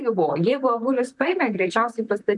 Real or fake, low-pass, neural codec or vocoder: fake; 10.8 kHz; vocoder, 44.1 kHz, 128 mel bands, Pupu-Vocoder